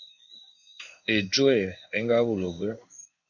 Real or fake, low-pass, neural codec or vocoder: fake; 7.2 kHz; codec, 16 kHz in and 24 kHz out, 1 kbps, XY-Tokenizer